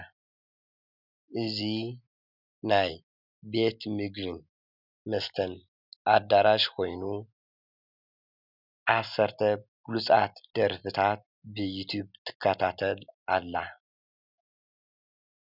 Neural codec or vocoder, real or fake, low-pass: none; real; 5.4 kHz